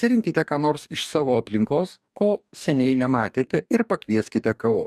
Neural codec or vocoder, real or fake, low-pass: codec, 44.1 kHz, 2.6 kbps, DAC; fake; 14.4 kHz